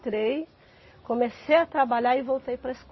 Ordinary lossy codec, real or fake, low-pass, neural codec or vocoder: MP3, 24 kbps; real; 7.2 kHz; none